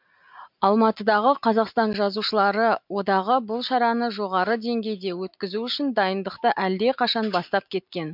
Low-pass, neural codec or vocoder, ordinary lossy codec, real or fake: 5.4 kHz; none; MP3, 48 kbps; real